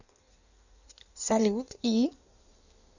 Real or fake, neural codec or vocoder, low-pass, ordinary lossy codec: fake; codec, 16 kHz in and 24 kHz out, 1.1 kbps, FireRedTTS-2 codec; 7.2 kHz; none